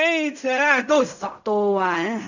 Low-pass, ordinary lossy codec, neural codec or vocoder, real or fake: 7.2 kHz; none; codec, 16 kHz in and 24 kHz out, 0.4 kbps, LongCat-Audio-Codec, fine tuned four codebook decoder; fake